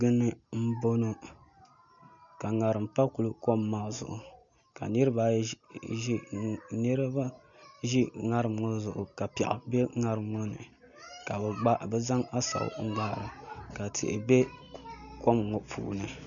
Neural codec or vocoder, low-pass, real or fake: none; 7.2 kHz; real